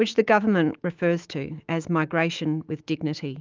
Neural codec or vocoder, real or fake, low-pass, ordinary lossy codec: autoencoder, 48 kHz, 128 numbers a frame, DAC-VAE, trained on Japanese speech; fake; 7.2 kHz; Opus, 32 kbps